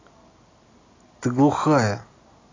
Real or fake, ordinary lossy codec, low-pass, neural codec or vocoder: real; AAC, 32 kbps; 7.2 kHz; none